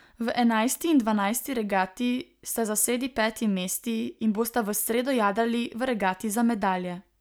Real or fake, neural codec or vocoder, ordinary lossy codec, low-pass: real; none; none; none